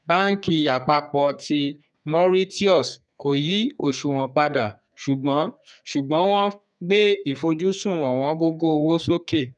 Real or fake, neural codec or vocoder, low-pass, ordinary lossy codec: fake; codec, 32 kHz, 1.9 kbps, SNAC; 10.8 kHz; none